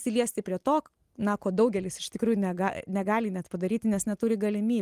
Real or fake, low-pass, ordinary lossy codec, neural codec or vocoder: real; 14.4 kHz; Opus, 24 kbps; none